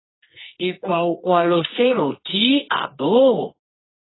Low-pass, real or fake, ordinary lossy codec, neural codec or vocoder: 7.2 kHz; fake; AAC, 16 kbps; codec, 24 kHz, 0.9 kbps, WavTokenizer, medium music audio release